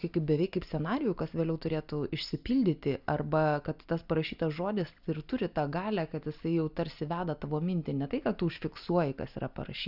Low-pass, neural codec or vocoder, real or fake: 5.4 kHz; none; real